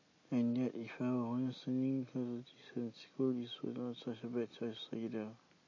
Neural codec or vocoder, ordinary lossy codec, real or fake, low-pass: none; MP3, 32 kbps; real; 7.2 kHz